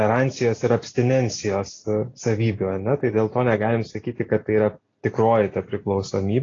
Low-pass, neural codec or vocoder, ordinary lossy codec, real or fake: 7.2 kHz; none; AAC, 32 kbps; real